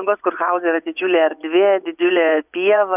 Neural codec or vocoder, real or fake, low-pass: vocoder, 24 kHz, 100 mel bands, Vocos; fake; 3.6 kHz